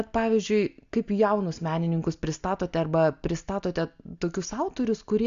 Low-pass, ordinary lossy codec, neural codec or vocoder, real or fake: 7.2 kHz; Opus, 64 kbps; none; real